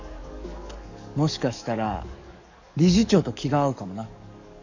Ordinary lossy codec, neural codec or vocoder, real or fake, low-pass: none; codec, 44.1 kHz, 7.8 kbps, DAC; fake; 7.2 kHz